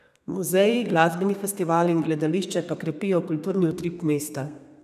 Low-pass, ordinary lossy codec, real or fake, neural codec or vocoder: 14.4 kHz; none; fake; codec, 32 kHz, 1.9 kbps, SNAC